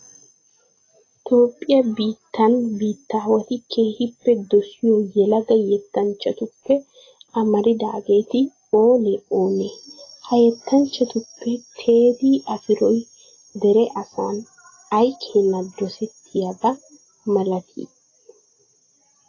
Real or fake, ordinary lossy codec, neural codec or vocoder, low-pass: real; AAC, 32 kbps; none; 7.2 kHz